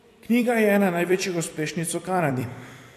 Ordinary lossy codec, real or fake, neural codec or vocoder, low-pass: AAC, 64 kbps; fake; vocoder, 44.1 kHz, 128 mel bands every 256 samples, BigVGAN v2; 14.4 kHz